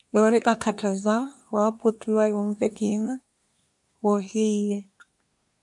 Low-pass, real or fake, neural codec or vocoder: 10.8 kHz; fake; codec, 24 kHz, 1 kbps, SNAC